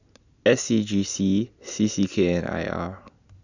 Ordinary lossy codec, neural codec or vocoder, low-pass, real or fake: none; none; 7.2 kHz; real